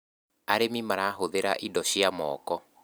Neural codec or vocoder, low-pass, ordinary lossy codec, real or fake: vocoder, 44.1 kHz, 128 mel bands every 256 samples, BigVGAN v2; none; none; fake